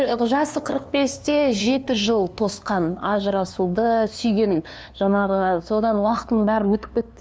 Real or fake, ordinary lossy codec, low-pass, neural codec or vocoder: fake; none; none; codec, 16 kHz, 2 kbps, FunCodec, trained on LibriTTS, 25 frames a second